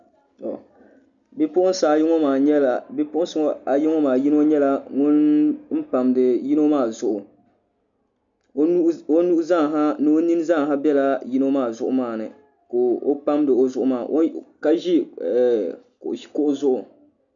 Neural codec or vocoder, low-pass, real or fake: none; 7.2 kHz; real